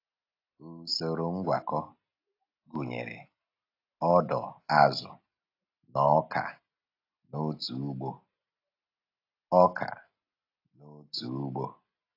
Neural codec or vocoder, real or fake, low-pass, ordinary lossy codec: none; real; 5.4 kHz; AAC, 32 kbps